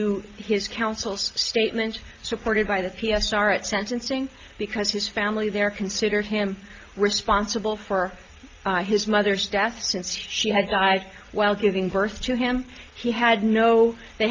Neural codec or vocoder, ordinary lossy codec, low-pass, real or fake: none; Opus, 32 kbps; 7.2 kHz; real